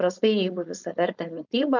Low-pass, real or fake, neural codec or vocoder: 7.2 kHz; fake; codec, 16 kHz, 4.8 kbps, FACodec